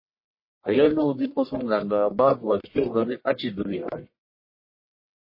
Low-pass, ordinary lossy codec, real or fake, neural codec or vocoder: 5.4 kHz; MP3, 24 kbps; fake; codec, 44.1 kHz, 1.7 kbps, Pupu-Codec